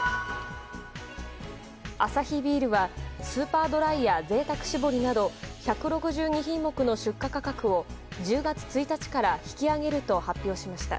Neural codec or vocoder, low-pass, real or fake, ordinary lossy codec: none; none; real; none